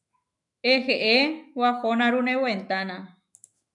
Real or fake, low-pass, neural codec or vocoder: fake; 10.8 kHz; autoencoder, 48 kHz, 128 numbers a frame, DAC-VAE, trained on Japanese speech